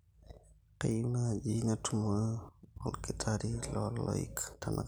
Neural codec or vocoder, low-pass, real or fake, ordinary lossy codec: none; none; real; none